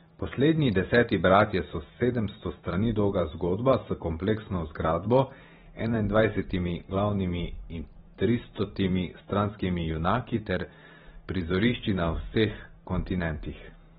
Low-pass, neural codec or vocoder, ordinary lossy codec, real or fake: 19.8 kHz; vocoder, 44.1 kHz, 128 mel bands every 512 samples, BigVGAN v2; AAC, 16 kbps; fake